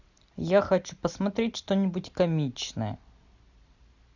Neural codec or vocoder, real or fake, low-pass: none; real; 7.2 kHz